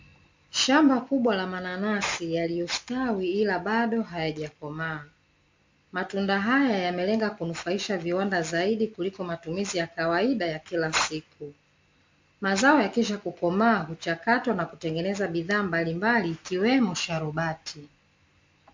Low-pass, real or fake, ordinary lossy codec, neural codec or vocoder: 7.2 kHz; real; MP3, 48 kbps; none